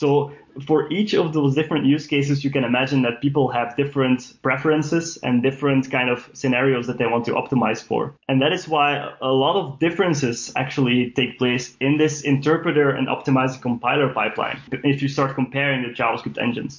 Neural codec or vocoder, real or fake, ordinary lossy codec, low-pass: none; real; MP3, 48 kbps; 7.2 kHz